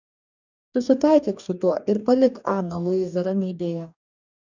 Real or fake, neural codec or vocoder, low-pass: fake; codec, 44.1 kHz, 2.6 kbps, DAC; 7.2 kHz